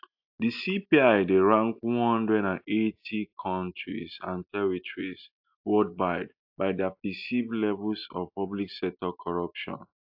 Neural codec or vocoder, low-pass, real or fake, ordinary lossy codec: none; 5.4 kHz; real; AAC, 48 kbps